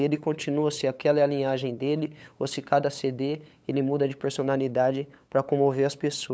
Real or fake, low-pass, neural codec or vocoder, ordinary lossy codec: fake; none; codec, 16 kHz, 8 kbps, FunCodec, trained on LibriTTS, 25 frames a second; none